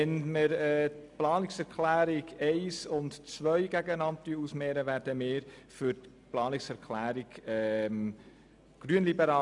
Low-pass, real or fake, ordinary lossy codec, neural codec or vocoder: 10.8 kHz; real; none; none